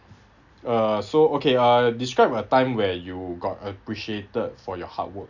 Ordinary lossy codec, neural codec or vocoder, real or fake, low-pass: none; none; real; 7.2 kHz